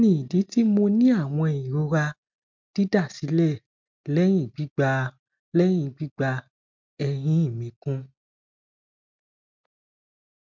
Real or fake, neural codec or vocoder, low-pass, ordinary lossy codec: real; none; 7.2 kHz; MP3, 64 kbps